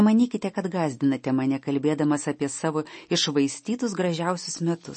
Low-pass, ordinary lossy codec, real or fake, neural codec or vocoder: 10.8 kHz; MP3, 32 kbps; real; none